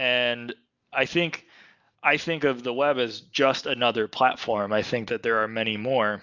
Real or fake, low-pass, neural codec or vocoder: real; 7.2 kHz; none